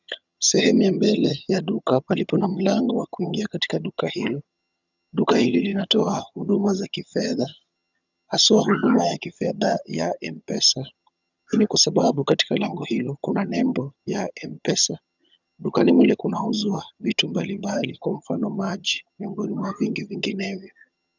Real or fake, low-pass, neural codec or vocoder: fake; 7.2 kHz; vocoder, 22.05 kHz, 80 mel bands, HiFi-GAN